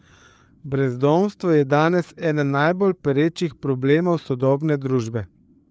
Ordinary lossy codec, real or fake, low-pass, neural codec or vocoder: none; fake; none; codec, 16 kHz, 4 kbps, FreqCodec, larger model